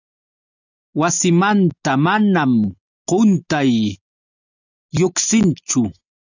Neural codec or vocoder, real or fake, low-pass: none; real; 7.2 kHz